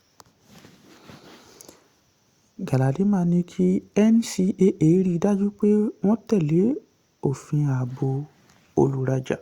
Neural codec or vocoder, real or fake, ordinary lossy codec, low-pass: none; real; Opus, 64 kbps; 19.8 kHz